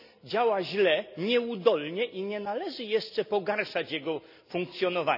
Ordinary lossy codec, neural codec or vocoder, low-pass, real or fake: none; none; 5.4 kHz; real